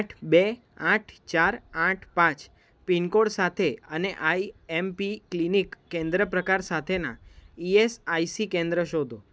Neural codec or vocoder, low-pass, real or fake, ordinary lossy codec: none; none; real; none